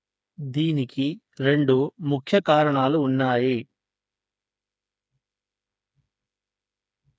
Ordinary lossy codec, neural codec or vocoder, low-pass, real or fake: none; codec, 16 kHz, 4 kbps, FreqCodec, smaller model; none; fake